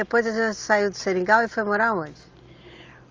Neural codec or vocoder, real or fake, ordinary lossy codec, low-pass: none; real; Opus, 32 kbps; 7.2 kHz